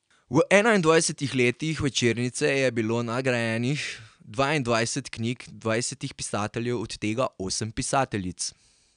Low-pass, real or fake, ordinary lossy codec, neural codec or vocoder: 9.9 kHz; real; none; none